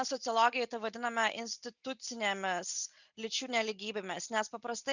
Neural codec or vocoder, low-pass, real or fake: none; 7.2 kHz; real